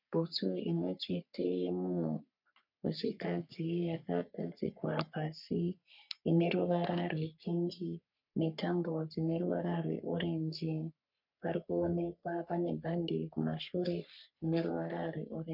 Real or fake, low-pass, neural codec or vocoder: fake; 5.4 kHz; codec, 44.1 kHz, 3.4 kbps, Pupu-Codec